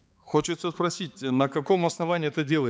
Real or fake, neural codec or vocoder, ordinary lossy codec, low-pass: fake; codec, 16 kHz, 4 kbps, X-Codec, HuBERT features, trained on balanced general audio; none; none